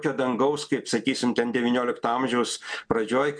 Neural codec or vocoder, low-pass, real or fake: none; 9.9 kHz; real